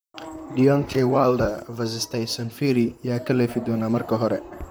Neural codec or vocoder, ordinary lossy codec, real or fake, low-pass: vocoder, 44.1 kHz, 128 mel bands, Pupu-Vocoder; none; fake; none